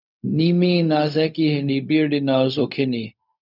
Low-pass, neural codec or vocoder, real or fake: 5.4 kHz; codec, 16 kHz, 0.4 kbps, LongCat-Audio-Codec; fake